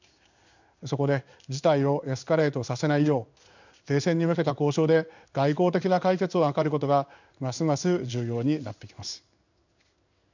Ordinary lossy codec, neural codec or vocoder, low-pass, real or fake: none; codec, 16 kHz in and 24 kHz out, 1 kbps, XY-Tokenizer; 7.2 kHz; fake